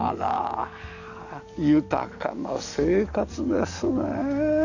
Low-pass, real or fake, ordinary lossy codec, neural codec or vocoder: 7.2 kHz; fake; none; codec, 44.1 kHz, 7.8 kbps, DAC